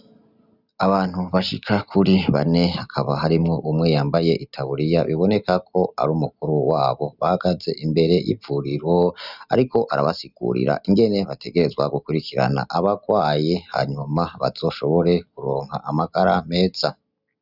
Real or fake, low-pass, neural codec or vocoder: real; 5.4 kHz; none